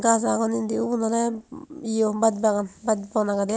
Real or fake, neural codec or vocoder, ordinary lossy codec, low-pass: real; none; none; none